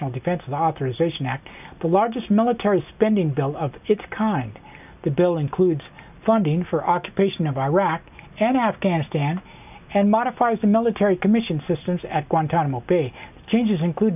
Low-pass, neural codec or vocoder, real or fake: 3.6 kHz; none; real